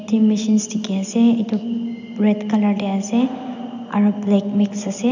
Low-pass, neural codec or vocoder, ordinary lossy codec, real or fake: 7.2 kHz; none; none; real